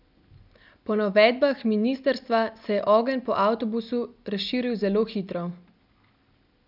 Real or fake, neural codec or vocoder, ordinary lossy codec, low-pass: real; none; none; 5.4 kHz